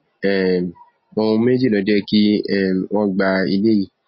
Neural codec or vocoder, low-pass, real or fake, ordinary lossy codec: none; 7.2 kHz; real; MP3, 24 kbps